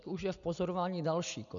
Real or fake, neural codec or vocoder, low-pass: real; none; 7.2 kHz